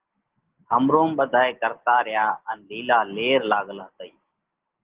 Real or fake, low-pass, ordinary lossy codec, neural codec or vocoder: real; 3.6 kHz; Opus, 16 kbps; none